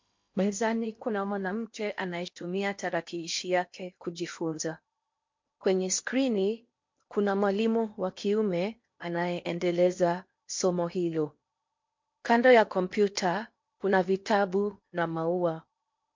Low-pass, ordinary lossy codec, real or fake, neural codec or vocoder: 7.2 kHz; MP3, 48 kbps; fake; codec, 16 kHz in and 24 kHz out, 0.8 kbps, FocalCodec, streaming, 65536 codes